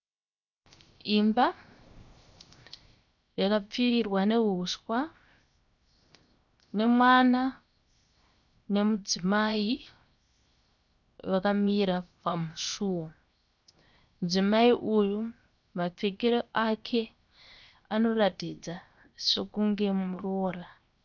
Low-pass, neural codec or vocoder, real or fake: 7.2 kHz; codec, 16 kHz, 0.7 kbps, FocalCodec; fake